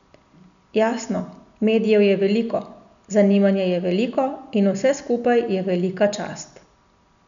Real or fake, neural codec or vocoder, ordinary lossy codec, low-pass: real; none; none; 7.2 kHz